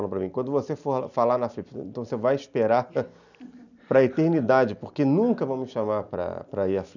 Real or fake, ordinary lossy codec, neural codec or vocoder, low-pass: real; none; none; 7.2 kHz